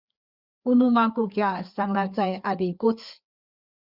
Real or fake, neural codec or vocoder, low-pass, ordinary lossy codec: fake; codec, 16 kHz, 4 kbps, FreqCodec, larger model; 5.4 kHz; Opus, 64 kbps